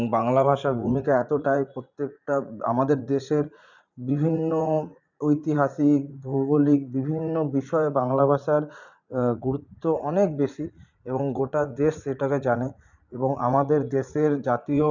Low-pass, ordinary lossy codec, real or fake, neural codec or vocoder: 7.2 kHz; none; fake; vocoder, 44.1 kHz, 80 mel bands, Vocos